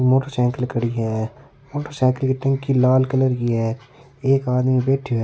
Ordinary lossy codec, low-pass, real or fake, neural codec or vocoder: none; none; real; none